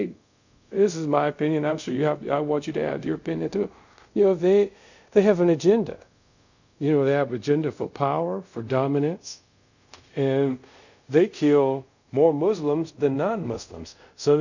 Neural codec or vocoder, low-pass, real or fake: codec, 24 kHz, 0.5 kbps, DualCodec; 7.2 kHz; fake